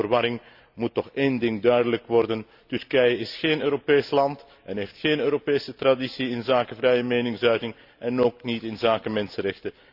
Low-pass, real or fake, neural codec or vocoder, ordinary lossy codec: 5.4 kHz; real; none; Opus, 64 kbps